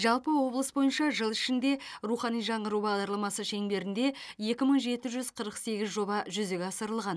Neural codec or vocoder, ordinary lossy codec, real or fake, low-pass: none; none; real; none